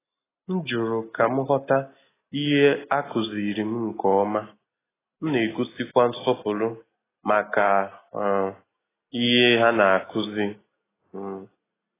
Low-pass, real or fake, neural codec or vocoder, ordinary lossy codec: 3.6 kHz; real; none; AAC, 16 kbps